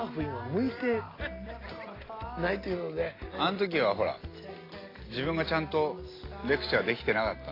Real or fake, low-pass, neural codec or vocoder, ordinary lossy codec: real; 5.4 kHz; none; AAC, 24 kbps